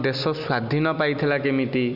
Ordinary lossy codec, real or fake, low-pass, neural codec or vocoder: none; real; 5.4 kHz; none